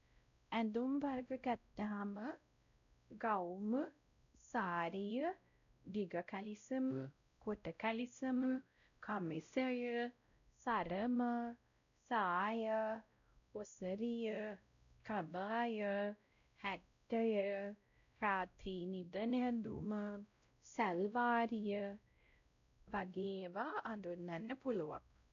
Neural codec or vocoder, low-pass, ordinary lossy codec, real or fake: codec, 16 kHz, 0.5 kbps, X-Codec, WavLM features, trained on Multilingual LibriSpeech; 7.2 kHz; none; fake